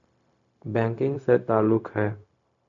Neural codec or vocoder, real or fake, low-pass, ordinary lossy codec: codec, 16 kHz, 0.4 kbps, LongCat-Audio-Codec; fake; 7.2 kHz; AAC, 64 kbps